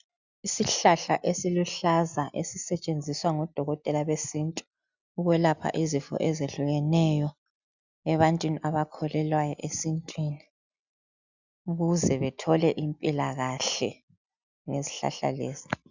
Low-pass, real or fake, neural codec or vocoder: 7.2 kHz; real; none